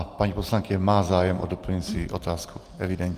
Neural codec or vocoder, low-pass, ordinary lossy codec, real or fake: none; 14.4 kHz; Opus, 32 kbps; real